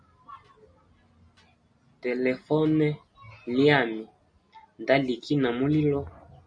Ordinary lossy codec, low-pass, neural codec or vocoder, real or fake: MP3, 48 kbps; 9.9 kHz; none; real